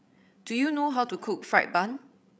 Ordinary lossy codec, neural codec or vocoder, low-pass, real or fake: none; codec, 16 kHz, 16 kbps, FreqCodec, larger model; none; fake